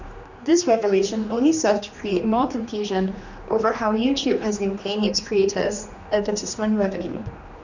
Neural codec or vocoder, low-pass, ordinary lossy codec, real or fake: codec, 16 kHz, 2 kbps, X-Codec, HuBERT features, trained on general audio; 7.2 kHz; none; fake